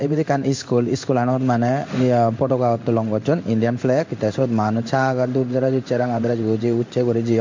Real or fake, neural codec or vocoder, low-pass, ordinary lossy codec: fake; codec, 16 kHz in and 24 kHz out, 1 kbps, XY-Tokenizer; 7.2 kHz; MP3, 48 kbps